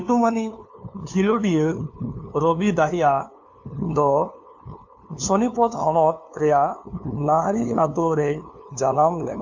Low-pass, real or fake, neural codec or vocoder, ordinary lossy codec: 7.2 kHz; fake; codec, 16 kHz, 2 kbps, FunCodec, trained on LibriTTS, 25 frames a second; AAC, 48 kbps